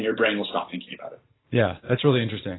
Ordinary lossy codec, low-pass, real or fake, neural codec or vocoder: AAC, 16 kbps; 7.2 kHz; real; none